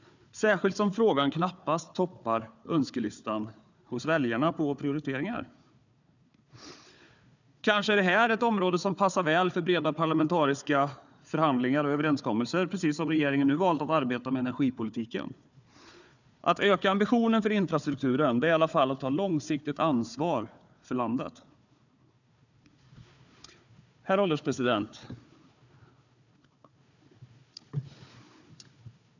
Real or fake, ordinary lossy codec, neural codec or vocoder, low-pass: fake; none; codec, 16 kHz, 4 kbps, FunCodec, trained on Chinese and English, 50 frames a second; 7.2 kHz